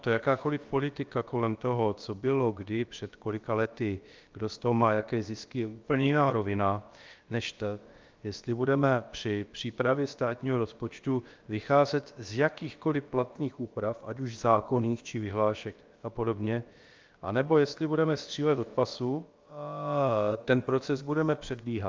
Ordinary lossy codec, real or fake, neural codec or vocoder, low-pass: Opus, 32 kbps; fake; codec, 16 kHz, about 1 kbps, DyCAST, with the encoder's durations; 7.2 kHz